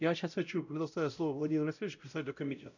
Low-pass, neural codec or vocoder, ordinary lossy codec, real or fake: 7.2 kHz; codec, 16 kHz, 0.5 kbps, X-Codec, WavLM features, trained on Multilingual LibriSpeech; none; fake